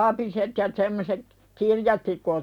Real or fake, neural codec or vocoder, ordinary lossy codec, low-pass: real; none; none; 19.8 kHz